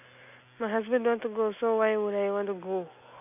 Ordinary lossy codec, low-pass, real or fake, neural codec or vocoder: none; 3.6 kHz; real; none